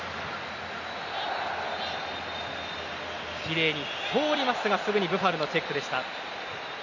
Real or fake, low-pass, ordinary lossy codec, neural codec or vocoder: real; 7.2 kHz; none; none